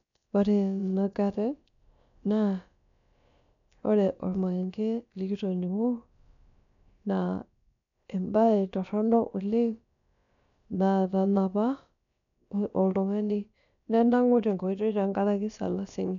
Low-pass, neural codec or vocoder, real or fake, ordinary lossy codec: 7.2 kHz; codec, 16 kHz, about 1 kbps, DyCAST, with the encoder's durations; fake; none